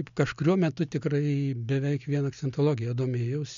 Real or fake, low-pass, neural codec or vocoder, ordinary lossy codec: real; 7.2 kHz; none; MP3, 64 kbps